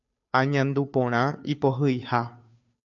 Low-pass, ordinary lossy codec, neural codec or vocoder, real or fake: 7.2 kHz; AAC, 64 kbps; codec, 16 kHz, 2 kbps, FunCodec, trained on Chinese and English, 25 frames a second; fake